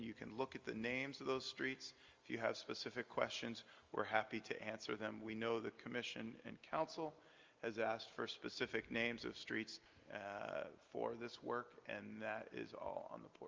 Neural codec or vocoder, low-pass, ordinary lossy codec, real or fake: none; 7.2 kHz; Opus, 32 kbps; real